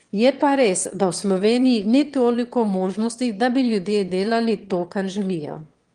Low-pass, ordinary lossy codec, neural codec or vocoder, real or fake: 9.9 kHz; Opus, 24 kbps; autoencoder, 22.05 kHz, a latent of 192 numbers a frame, VITS, trained on one speaker; fake